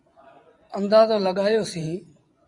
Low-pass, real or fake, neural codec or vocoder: 10.8 kHz; fake; vocoder, 24 kHz, 100 mel bands, Vocos